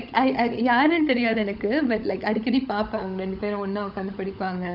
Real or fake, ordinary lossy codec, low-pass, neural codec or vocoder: fake; none; 5.4 kHz; codec, 16 kHz, 16 kbps, FunCodec, trained on LibriTTS, 50 frames a second